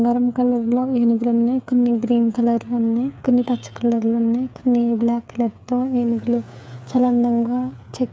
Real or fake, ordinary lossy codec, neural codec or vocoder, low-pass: fake; none; codec, 16 kHz, 8 kbps, FreqCodec, smaller model; none